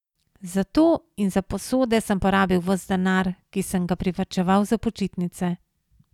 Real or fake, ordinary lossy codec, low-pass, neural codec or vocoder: real; none; 19.8 kHz; none